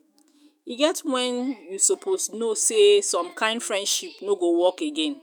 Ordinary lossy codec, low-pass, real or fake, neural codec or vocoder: none; none; fake; autoencoder, 48 kHz, 128 numbers a frame, DAC-VAE, trained on Japanese speech